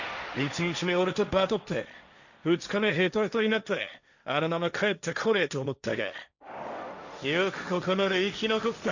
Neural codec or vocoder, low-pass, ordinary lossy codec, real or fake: codec, 16 kHz, 1.1 kbps, Voila-Tokenizer; 7.2 kHz; none; fake